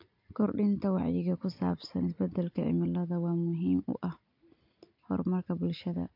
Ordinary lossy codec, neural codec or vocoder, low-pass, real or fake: AAC, 48 kbps; none; 5.4 kHz; real